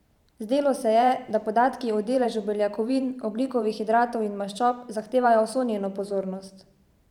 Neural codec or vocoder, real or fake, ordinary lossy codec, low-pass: vocoder, 44.1 kHz, 128 mel bands every 512 samples, BigVGAN v2; fake; none; 19.8 kHz